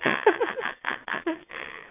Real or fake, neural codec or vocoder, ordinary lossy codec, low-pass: fake; vocoder, 22.05 kHz, 80 mel bands, WaveNeXt; none; 3.6 kHz